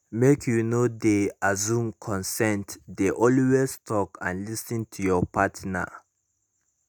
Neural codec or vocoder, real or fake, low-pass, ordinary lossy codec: none; real; 19.8 kHz; none